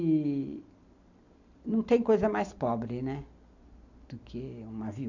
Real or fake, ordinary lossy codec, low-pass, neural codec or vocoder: real; none; 7.2 kHz; none